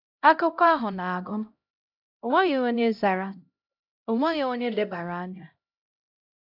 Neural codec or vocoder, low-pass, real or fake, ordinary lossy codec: codec, 16 kHz, 0.5 kbps, X-Codec, HuBERT features, trained on LibriSpeech; 5.4 kHz; fake; AAC, 48 kbps